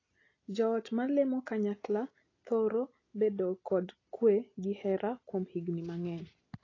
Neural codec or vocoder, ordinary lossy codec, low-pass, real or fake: none; AAC, 32 kbps; 7.2 kHz; real